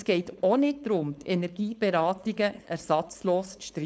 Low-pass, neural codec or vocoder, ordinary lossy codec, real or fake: none; codec, 16 kHz, 4.8 kbps, FACodec; none; fake